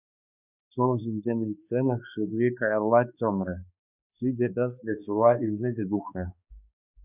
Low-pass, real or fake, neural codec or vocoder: 3.6 kHz; fake; codec, 16 kHz, 2 kbps, X-Codec, HuBERT features, trained on balanced general audio